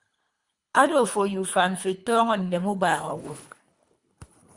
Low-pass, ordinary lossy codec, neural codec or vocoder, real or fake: 10.8 kHz; Opus, 64 kbps; codec, 24 kHz, 3 kbps, HILCodec; fake